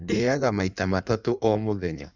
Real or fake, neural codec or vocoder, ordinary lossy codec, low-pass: fake; codec, 16 kHz in and 24 kHz out, 1.1 kbps, FireRedTTS-2 codec; none; 7.2 kHz